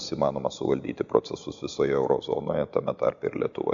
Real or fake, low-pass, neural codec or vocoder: real; 7.2 kHz; none